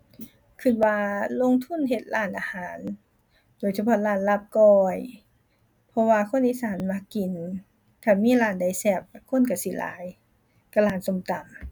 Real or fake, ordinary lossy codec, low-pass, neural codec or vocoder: real; none; 19.8 kHz; none